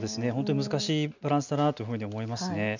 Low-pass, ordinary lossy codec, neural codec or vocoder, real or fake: 7.2 kHz; none; none; real